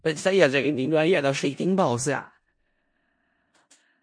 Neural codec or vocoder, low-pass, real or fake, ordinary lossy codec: codec, 16 kHz in and 24 kHz out, 0.4 kbps, LongCat-Audio-Codec, four codebook decoder; 9.9 kHz; fake; MP3, 48 kbps